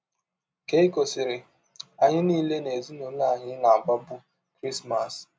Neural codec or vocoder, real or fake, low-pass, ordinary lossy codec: none; real; none; none